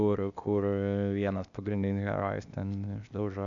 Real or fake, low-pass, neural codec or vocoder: fake; 7.2 kHz; codec, 16 kHz, 0.8 kbps, ZipCodec